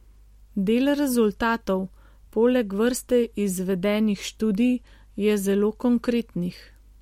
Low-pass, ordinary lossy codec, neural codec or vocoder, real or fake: 19.8 kHz; MP3, 64 kbps; none; real